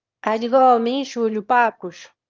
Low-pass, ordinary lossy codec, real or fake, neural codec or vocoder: 7.2 kHz; Opus, 24 kbps; fake; autoencoder, 22.05 kHz, a latent of 192 numbers a frame, VITS, trained on one speaker